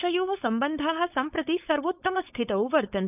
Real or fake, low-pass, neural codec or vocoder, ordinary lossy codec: fake; 3.6 kHz; codec, 16 kHz, 4.8 kbps, FACodec; none